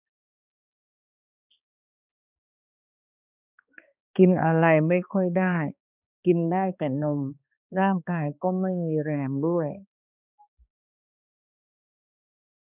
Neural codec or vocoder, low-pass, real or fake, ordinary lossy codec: codec, 16 kHz, 2 kbps, X-Codec, HuBERT features, trained on balanced general audio; 3.6 kHz; fake; none